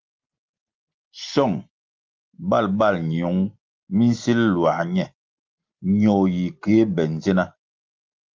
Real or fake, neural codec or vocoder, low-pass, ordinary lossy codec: real; none; 7.2 kHz; Opus, 32 kbps